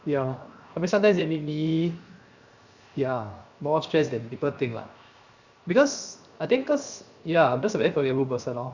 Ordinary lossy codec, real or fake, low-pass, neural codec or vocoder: Opus, 64 kbps; fake; 7.2 kHz; codec, 16 kHz, 0.7 kbps, FocalCodec